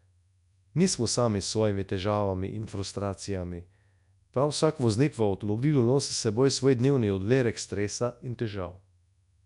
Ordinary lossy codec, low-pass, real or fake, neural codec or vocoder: none; 10.8 kHz; fake; codec, 24 kHz, 0.9 kbps, WavTokenizer, large speech release